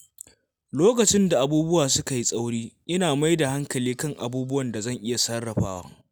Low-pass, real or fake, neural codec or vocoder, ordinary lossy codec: none; real; none; none